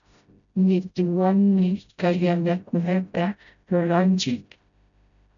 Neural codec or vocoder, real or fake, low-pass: codec, 16 kHz, 0.5 kbps, FreqCodec, smaller model; fake; 7.2 kHz